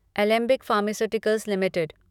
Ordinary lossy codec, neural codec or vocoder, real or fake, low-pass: none; autoencoder, 48 kHz, 128 numbers a frame, DAC-VAE, trained on Japanese speech; fake; 19.8 kHz